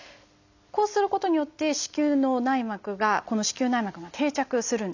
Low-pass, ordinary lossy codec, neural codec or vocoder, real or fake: 7.2 kHz; none; none; real